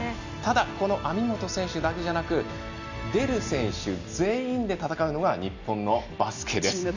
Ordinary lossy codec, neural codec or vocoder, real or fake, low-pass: none; none; real; 7.2 kHz